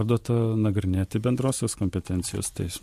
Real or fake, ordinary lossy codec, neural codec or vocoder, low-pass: fake; MP3, 64 kbps; vocoder, 44.1 kHz, 128 mel bands every 512 samples, BigVGAN v2; 14.4 kHz